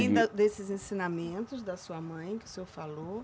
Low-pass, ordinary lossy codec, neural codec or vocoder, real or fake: none; none; none; real